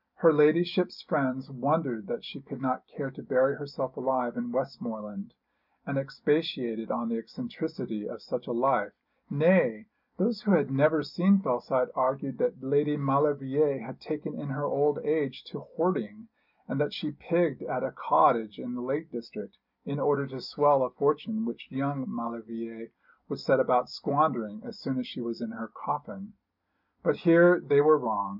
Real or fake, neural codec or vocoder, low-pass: real; none; 5.4 kHz